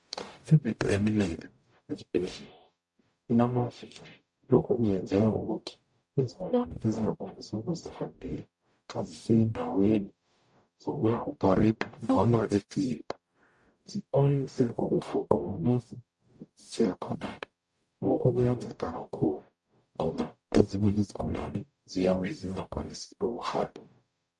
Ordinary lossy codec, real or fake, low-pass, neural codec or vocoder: MP3, 64 kbps; fake; 10.8 kHz; codec, 44.1 kHz, 0.9 kbps, DAC